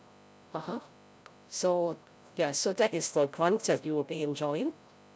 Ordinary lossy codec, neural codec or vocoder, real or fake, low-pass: none; codec, 16 kHz, 0.5 kbps, FreqCodec, larger model; fake; none